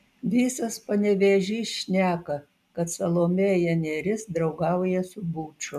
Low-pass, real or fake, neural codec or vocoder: 14.4 kHz; real; none